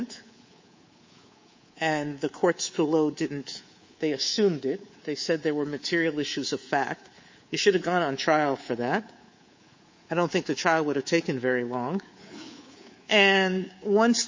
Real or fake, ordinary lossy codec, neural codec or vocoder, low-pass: fake; MP3, 32 kbps; codec, 24 kHz, 3.1 kbps, DualCodec; 7.2 kHz